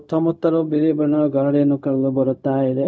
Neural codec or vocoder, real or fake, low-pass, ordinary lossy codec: codec, 16 kHz, 0.4 kbps, LongCat-Audio-Codec; fake; none; none